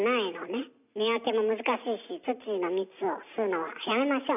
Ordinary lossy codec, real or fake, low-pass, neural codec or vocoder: none; real; 3.6 kHz; none